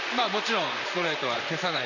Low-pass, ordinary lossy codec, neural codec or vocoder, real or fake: 7.2 kHz; none; vocoder, 44.1 kHz, 128 mel bands, Pupu-Vocoder; fake